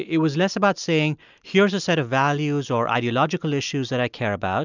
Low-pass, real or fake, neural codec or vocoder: 7.2 kHz; real; none